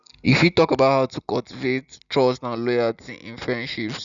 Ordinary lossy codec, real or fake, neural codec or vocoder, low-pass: none; real; none; 7.2 kHz